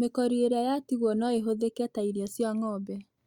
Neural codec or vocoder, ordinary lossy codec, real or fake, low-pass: none; Opus, 64 kbps; real; 19.8 kHz